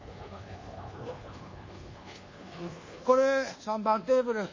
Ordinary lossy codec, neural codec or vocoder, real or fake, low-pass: MP3, 32 kbps; codec, 24 kHz, 1.2 kbps, DualCodec; fake; 7.2 kHz